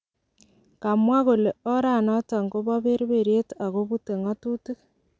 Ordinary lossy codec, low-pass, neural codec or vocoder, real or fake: none; none; none; real